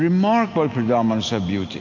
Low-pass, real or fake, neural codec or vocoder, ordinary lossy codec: 7.2 kHz; real; none; AAC, 48 kbps